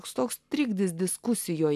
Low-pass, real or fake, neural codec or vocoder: 14.4 kHz; real; none